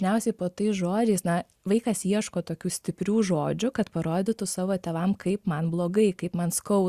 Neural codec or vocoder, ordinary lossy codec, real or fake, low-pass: none; Opus, 64 kbps; real; 14.4 kHz